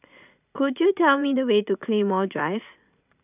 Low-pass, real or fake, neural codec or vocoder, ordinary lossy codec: 3.6 kHz; fake; vocoder, 44.1 kHz, 80 mel bands, Vocos; none